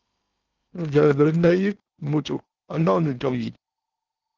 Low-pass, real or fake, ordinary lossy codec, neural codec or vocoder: 7.2 kHz; fake; Opus, 24 kbps; codec, 16 kHz in and 24 kHz out, 0.8 kbps, FocalCodec, streaming, 65536 codes